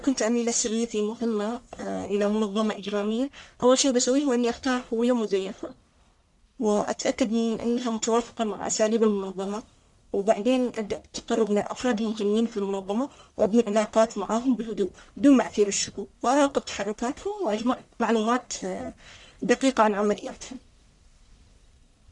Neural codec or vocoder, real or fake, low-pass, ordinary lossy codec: codec, 44.1 kHz, 1.7 kbps, Pupu-Codec; fake; 10.8 kHz; none